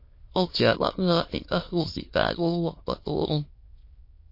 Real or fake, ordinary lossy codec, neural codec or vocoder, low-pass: fake; MP3, 32 kbps; autoencoder, 22.05 kHz, a latent of 192 numbers a frame, VITS, trained on many speakers; 5.4 kHz